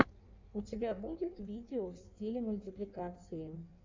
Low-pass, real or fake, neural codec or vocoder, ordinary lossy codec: 7.2 kHz; fake; codec, 16 kHz in and 24 kHz out, 1.1 kbps, FireRedTTS-2 codec; MP3, 64 kbps